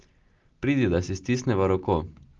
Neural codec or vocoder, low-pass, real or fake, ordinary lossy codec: none; 7.2 kHz; real; Opus, 32 kbps